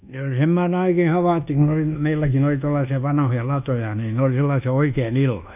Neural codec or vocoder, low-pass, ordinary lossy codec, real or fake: codec, 24 kHz, 1.2 kbps, DualCodec; 3.6 kHz; none; fake